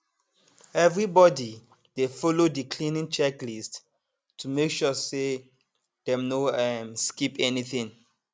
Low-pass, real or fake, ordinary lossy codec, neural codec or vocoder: none; real; none; none